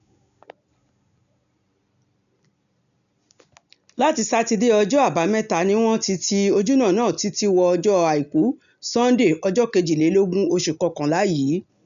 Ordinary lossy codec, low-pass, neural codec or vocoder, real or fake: none; 7.2 kHz; none; real